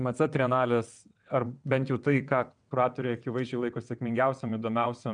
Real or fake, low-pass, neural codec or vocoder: fake; 9.9 kHz; vocoder, 22.05 kHz, 80 mel bands, WaveNeXt